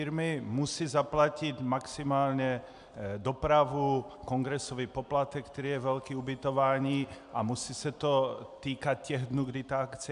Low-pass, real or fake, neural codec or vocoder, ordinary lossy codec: 10.8 kHz; real; none; AAC, 96 kbps